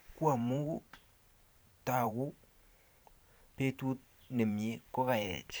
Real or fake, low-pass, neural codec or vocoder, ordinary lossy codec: fake; none; vocoder, 44.1 kHz, 128 mel bands every 512 samples, BigVGAN v2; none